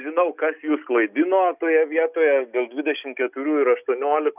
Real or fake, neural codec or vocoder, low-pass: real; none; 3.6 kHz